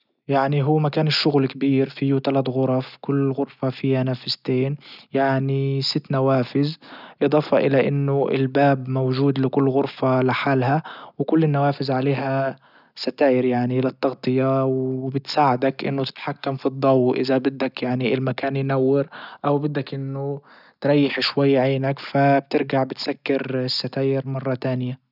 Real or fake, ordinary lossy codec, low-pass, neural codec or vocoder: real; none; 5.4 kHz; none